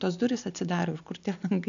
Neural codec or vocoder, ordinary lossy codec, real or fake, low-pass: none; MP3, 96 kbps; real; 7.2 kHz